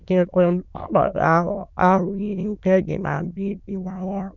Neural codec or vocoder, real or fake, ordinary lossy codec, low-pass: autoencoder, 22.05 kHz, a latent of 192 numbers a frame, VITS, trained on many speakers; fake; none; 7.2 kHz